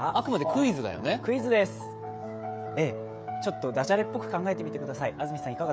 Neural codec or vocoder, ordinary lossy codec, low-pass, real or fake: codec, 16 kHz, 16 kbps, FreqCodec, smaller model; none; none; fake